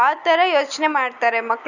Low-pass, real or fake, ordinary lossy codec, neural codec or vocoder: 7.2 kHz; real; none; none